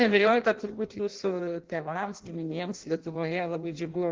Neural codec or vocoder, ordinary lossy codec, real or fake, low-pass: codec, 16 kHz in and 24 kHz out, 0.6 kbps, FireRedTTS-2 codec; Opus, 16 kbps; fake; 7.2 kHz